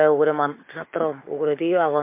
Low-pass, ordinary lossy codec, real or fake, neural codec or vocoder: 3.6 kHz; MP3, 24 kbps; fake; codec, 16 kHz, 6 kbps, DAC